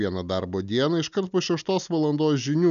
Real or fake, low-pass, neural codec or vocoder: real; 7.2 kHz; none